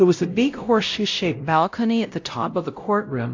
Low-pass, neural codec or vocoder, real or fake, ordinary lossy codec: 7.2 kHz; codec, 16 kHz, 0.5 kbps, X-Codec, WavLM features, trained on Multilingual LibriSpeech; fake; AAC, 48 kbps